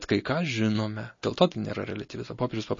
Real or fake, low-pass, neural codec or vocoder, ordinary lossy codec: real; 7.2 kHz; none; MP3, 32 kbps